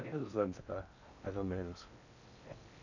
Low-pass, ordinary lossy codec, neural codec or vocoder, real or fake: 7.2 kHz; none; codec, 16 kHz in and 24 kHz out, 0.6 kbps, FocalCodec, streaming, 4096 codes; fake